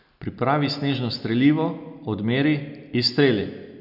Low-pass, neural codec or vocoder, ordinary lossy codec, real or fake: 5.4 kHz; none; none; real